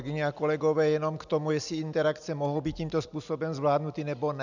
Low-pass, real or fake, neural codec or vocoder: 7.2 kHz; real; none